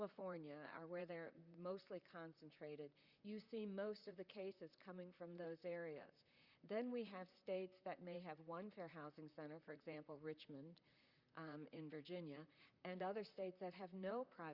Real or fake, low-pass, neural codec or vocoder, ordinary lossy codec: fake; 5.4 kHz; vocoder, 44.1 kHz, 128 mel bands, Pupu-Vocoder; Opus, 64 kbps